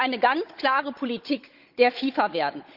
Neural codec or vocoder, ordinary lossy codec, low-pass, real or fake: codec, 16 kHz, 16 kbps, FunCodec, trained on Chinese and English, 50 frames a second; Opus, 24 kbps; 5.4 kHz; fake